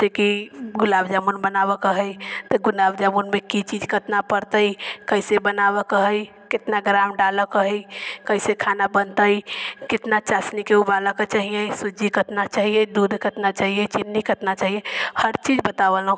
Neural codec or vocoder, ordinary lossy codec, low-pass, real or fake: none; none; none; real